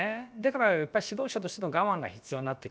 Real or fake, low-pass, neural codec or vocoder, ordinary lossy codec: fake; none; codec, 16 kHz, about 1 kbps, DyCAST, with the encoder's durations; none